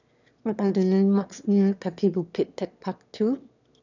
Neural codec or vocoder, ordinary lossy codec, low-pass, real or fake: autoencoder, 22.05 kHz, a latent of 192 numbers a frame, VITS, trained on one speaker; none; 7.2 kHz; fake